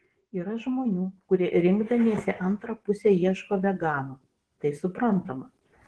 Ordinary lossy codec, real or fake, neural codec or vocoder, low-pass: Opus, 16 kbps; fake; vocoder, 24 kHz, 100 mel bands, Vocos; 10.8 kHz